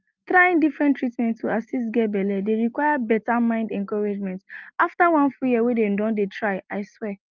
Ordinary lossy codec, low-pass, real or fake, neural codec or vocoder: Opus, 24 kbps; 7.2 kHz; real; none